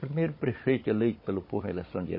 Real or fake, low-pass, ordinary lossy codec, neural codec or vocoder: fake; 5.4 kHz; MP3, 24 kbps; codec, 16 kHz, 16 kbps, FunCodec, trained on Chinese and English, 50 frames a second